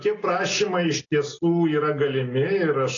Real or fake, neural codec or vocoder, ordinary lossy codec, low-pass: real; none; AAC, 32 kbps; 7.2 kHz